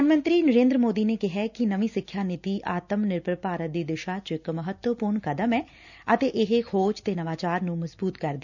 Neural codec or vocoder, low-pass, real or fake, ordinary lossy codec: none; 7.2 kHz; real; none